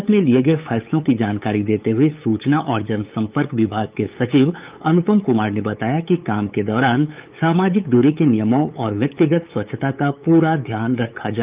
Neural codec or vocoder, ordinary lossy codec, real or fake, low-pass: codec, 16 kHz, 8 kbps, FunCodec, trained on LibriTTS, 25 frames a second; Opus, 24 kbps; fake; 3.6 kHz